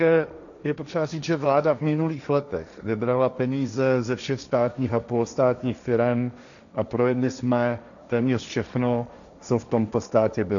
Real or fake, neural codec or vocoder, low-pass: fake; codec, 16 kHz, 1.1 kbps, Voila-Tokenizer; 7.2 kHz